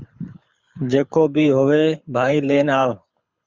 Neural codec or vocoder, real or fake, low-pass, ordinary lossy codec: codec, 24 kHz, 6 kbps, HILCodec; fake; 7.2 kHz; Opus, 64 kbps